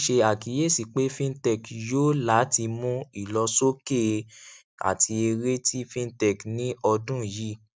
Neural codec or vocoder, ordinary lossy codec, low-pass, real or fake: none; none; none; real